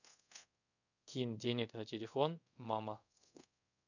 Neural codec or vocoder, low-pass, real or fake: codec, 24 kHz, 0.5 kbps, DualCodec; 7.2 kHz; fake